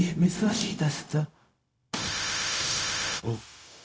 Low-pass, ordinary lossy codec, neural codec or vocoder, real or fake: none; none; codec, 16 kHz, 0.4 kbps, LongCat-Audio-Codec; fake